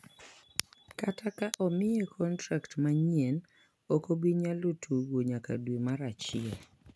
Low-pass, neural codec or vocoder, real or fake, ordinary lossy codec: none; none; real; none